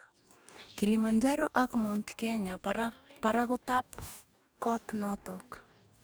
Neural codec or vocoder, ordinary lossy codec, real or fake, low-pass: codec, 44.1 kHz, 2.6 kbps, DAC; none; fake; none